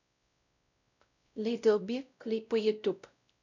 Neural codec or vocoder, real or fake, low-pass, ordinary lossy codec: codec, 16 kHz, 0.5 kbps, X-Codec, WavLM features, trained on Multilingual LibriSpeech; fake; 7.2 kHz; none